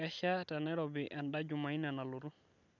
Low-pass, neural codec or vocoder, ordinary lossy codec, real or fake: 7.2 kHz; none; none; real